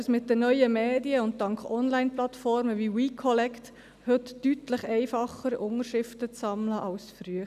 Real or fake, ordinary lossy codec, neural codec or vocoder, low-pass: real; none; none; 14.4 kHz